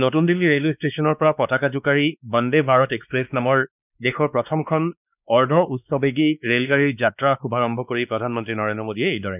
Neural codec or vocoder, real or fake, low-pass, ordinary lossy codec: codec, 16 kHz, 2 kbps, X-Codec, WavLM features, trained on Multilingual LibriSpeech; fake; 3.6 kHz; none